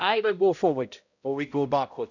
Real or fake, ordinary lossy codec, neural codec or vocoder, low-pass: fake; none; codec, 16 kHz, 0.5 kbps, X-Codec, HuBERT features, trained on balanced general audio; 7.2 kHz